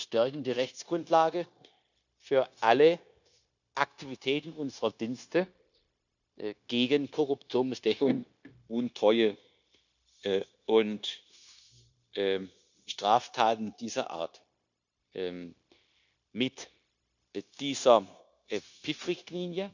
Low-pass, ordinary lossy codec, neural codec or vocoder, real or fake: 7.2 kHz; none; codec, 16 kHz, 0.9 kbps, LongCat-Audio-Codec; fake